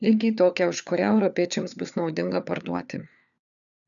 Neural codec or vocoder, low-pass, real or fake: codec, 16 kHz, 4 kbps, FunCodec, trained on LibriTTS, 50 frames a second; 7.2 kHz; fake